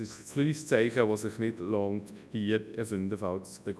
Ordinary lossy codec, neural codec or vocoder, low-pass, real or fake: none; codec, 24 kHz, 0.9 kbps, WavTokenizer, large speech release; none; fake